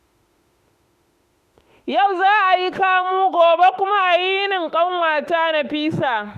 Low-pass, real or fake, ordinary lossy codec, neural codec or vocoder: 14.4 kHz; fake; Opus, 64 kbps; autoencoder, 48 kHz, 32 numbers a frame, DAC-VAE, trained on Japanese speech